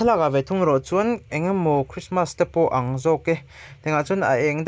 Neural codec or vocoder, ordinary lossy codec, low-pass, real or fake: none; none; none; real